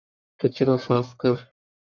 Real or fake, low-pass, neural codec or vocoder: fake; 7.2 kHz; codec, 44.1 kHz, 1.7 kbps, Pupu-Codec